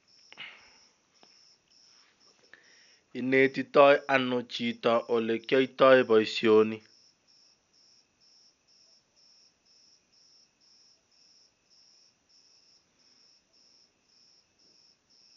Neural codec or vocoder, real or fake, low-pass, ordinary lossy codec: none; real; 7.2 kHz; none